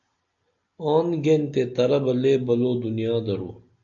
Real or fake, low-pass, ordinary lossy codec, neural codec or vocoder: real; 7.2 kHz; AAC, 64 kbps; none